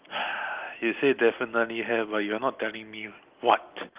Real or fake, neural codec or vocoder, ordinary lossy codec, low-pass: real; none; Opus, 32 kbps; 3.6 kHz